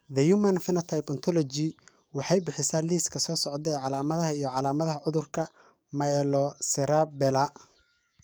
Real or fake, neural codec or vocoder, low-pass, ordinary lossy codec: fake; codec, 44.1 kHz, 7.8 kbps, DAC; none; none